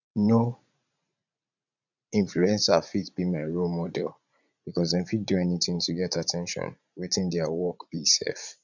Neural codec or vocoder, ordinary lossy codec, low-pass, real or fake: vocoder, 44.1 kHz, 80 mel bands, Vocos; none; 7.2 kHz; fake